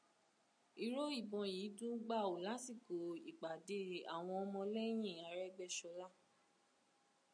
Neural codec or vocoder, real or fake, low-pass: none; real; 9.9 kHz